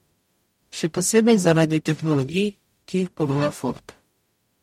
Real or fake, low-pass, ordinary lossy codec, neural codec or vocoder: fake; 19.8 kHz; MP3, 64 kbps; codec, 44.1 kHz, 0.9 kbps, DAC